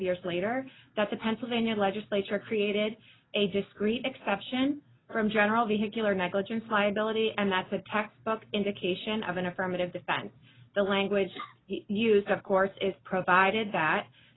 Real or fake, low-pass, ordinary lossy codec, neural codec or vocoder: real; 7.2 kHz; AAC, 16 kbps; none